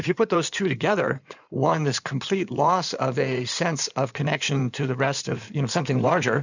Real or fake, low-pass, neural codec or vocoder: fake; 7.2 kHz; codec, 16 kHz in and 24 kHz out, 2.2 kbps, FireRedTTS-2 codec